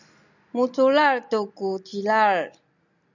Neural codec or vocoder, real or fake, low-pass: none; real; 7.2 kHz